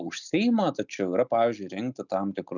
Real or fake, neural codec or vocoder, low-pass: real; none; 7.2 kHz